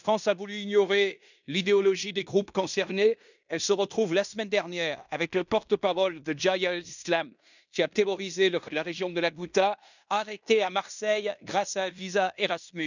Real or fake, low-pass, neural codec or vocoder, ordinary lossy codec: fake; 7.2 kHz; codec, 16 kHz in and 24 kHz out, 0.9 kbps, LongCat-Audio-Codec, fine tuned four codebook decoder; none